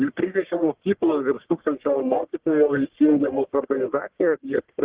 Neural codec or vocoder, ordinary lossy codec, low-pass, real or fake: codec, 44.1 kHz, 1.7 kbps, Pupu-Codec; Opus, 16 kbps; 3.6 kHz; fake